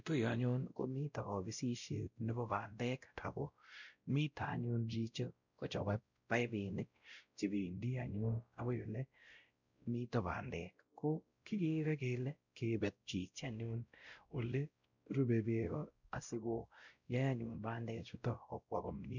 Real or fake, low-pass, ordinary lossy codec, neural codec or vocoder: fake; 7.2 kHz; none; codec, 16 kHz, 0.5 kbps, X-Codec, WavLM features, trained on Multilingual LibriSpeech